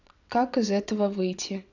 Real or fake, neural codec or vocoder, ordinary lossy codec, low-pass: fake; vocoder, 22.05 kHz, 80 mel bands, WaveNeXt; none; 7.2 kHz